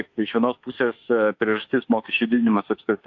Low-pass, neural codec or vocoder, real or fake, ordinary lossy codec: 7.2 kHz; autoencoder, 48 kHz, 32 numbers a frame, DAC-VAE, trained on Japanese speech; fake; AAC, 48 kbps